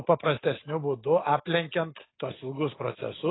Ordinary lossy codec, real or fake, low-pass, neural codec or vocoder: AAC, 16 kbps; real; 7.2 kHz; none